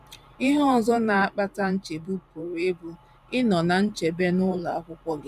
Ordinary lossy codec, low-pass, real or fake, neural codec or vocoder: none; 14.4 kHz; fake; vocoder, 44.1 kHz, 128 mel bands every 512 samples, BigVGAN v2